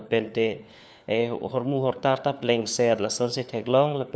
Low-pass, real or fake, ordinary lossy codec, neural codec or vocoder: none; fake; none; codec, 16 kHz, 4 kbps, FunCodec, trained on LibriTTS, 50 frames a second